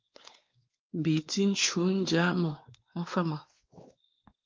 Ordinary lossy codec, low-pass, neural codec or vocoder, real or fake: Opus, 24 kbps; 7.2 kHz; codec, 16 kHz, 2 kbps, X-Codec, WavLM features, trained on Multilingual LibriSpeech; fake